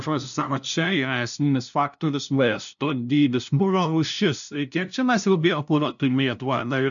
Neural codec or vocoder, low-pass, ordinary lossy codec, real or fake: codec, 16 kHz, 0.5 kbps, FunCodec, trained on LibriTTS, 25 frames a second; 7.2 kHz; MP3, 96 kbps; fake